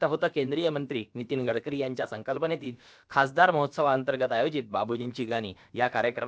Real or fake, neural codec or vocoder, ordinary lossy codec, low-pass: fake; codec, 16 kHz, about 1 kbps, DyCAST, with the encoder's durations; none; none